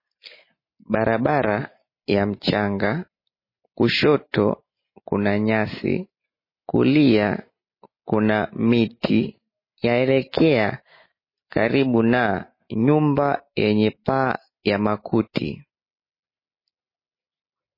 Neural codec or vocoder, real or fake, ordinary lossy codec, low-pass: none; real; MP3, 24 kbps; 5.4 kHz